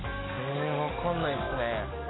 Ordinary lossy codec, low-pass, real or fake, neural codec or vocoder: AAC, 16 kbps; 7.2 kHz; fake; codec, 44.1 kHz, 7.8 kbps, DAC